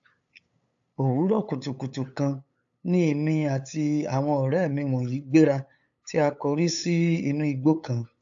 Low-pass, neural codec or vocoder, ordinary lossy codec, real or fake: 7.2 kHz; codec, 16 kHz, 8 kbps, FunCodec, trained on LibriTTS, 25 frames a second; AAC, 64 kbps; fake